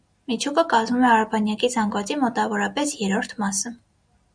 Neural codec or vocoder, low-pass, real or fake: none; 9.9 kHz; real